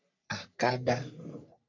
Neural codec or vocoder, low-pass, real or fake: codec, 44.1 kHz, 3.4 kbps, Pupu-Codec; 7.2 kHz; fake